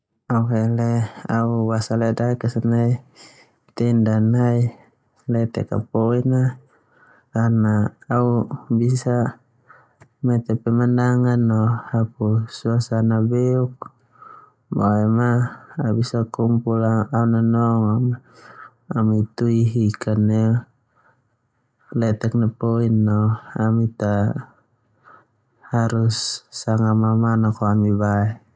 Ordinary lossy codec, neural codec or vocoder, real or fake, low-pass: none; none; real; none